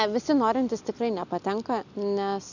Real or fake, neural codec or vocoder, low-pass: real; none; 7.2 kHz